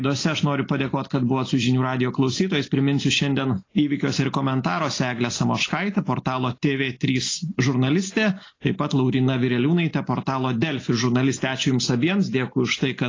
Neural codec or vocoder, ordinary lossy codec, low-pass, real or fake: none; AAC, 32 kbps; 7.2 kHz; real